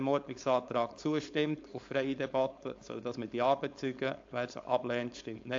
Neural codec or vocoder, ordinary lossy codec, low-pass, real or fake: codec, 16 kHz, 4.8 kbps, FACodec; AAC, 48 kbps; 7.2 kHz; fake